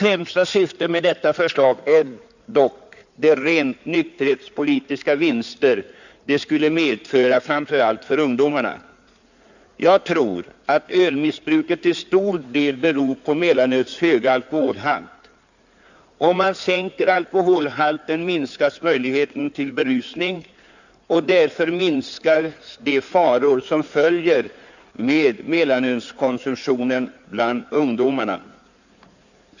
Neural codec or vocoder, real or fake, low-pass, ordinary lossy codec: codec, 16 kHz in and 24 kHz out, 2.2 kbps, FireRedTTS-2 codec; fake; 7.2 kHz; none